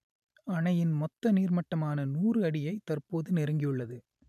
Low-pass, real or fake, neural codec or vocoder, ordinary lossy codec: 14.4 kHz; fake; vocoder, 44.1 kHz, 128 mel bands every 512 samples, BigVGAN v2; none